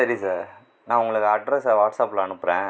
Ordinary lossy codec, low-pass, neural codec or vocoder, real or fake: none; none; none; real